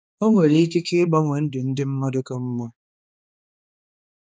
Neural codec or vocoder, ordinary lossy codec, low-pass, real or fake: codec, 16 kHz, 2 kbps, X-Codec, HuBERT features, trained on balanced general audio; none; none; fake